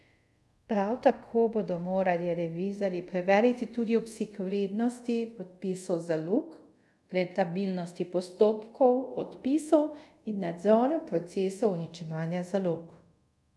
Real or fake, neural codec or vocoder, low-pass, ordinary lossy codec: fake; codec, 24 kHz, 0.5 kbps, DualCodec; none; none